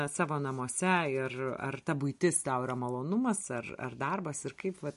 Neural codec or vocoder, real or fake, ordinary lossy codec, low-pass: none; real; MP3, 48 kbps; 14.4 kHz